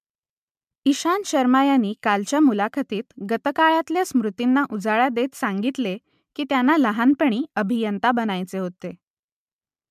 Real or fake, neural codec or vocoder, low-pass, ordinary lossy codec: real; none; 14.4 kHz; MP3, 96 kbps